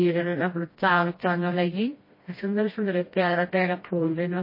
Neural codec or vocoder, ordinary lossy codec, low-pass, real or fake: codec, 16 kHz, 1 kbps, FreqCodec, smaller model; MP3, 24 kbps; 5.4 kHz; fake